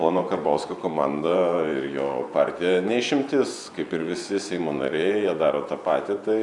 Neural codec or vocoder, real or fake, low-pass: vocoder, 24 kHz, 100 mel bands, Vocos; fake; 10.8 kHz